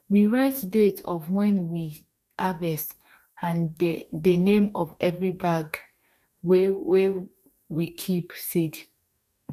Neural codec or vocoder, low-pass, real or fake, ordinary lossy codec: codec, 44.1 kHz, 2.6 kbps, DAC; 14.4 kHz; fake; none